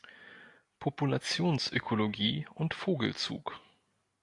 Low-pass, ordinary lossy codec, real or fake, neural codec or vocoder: 10.8 kHz; AAC, 48 kbps; real; none